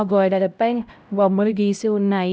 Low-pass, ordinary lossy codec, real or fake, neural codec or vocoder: none; none; fake; codec, 16 kHz, 0.5 kbps, X-Codec, HuBERT features, trained on LibriSpeech